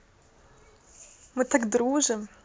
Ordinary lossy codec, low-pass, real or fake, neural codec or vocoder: none; none; real; none